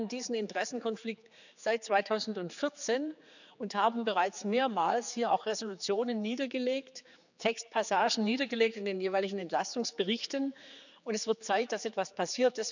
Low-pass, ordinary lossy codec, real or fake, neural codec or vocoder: 7.2 kHz; none; fake; codec, 16 kHz, 4 kbps, X-Codec, HuBERT features, trained on general audio